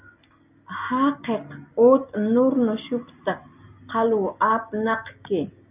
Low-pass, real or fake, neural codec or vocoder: 3.6 kHz; real; none